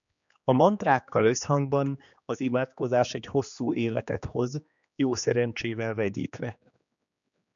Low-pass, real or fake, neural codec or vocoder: 7.2 kHz; fake; codec, 16 kHz, 2 kbps, X-Codec, HuBERT features, trained on general audio